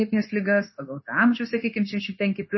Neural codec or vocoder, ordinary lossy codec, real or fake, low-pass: codec, 16 kHz, 0.9 kbps, LongCat-Audio-Codec; MP3, 24 kbps; fake; 7.2 kHz